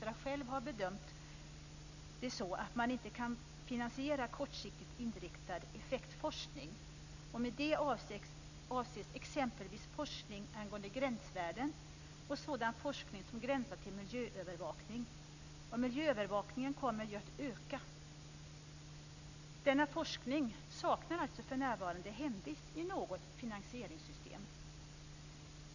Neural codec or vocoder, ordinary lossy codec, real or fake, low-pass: none; none; real; 7.2 kHz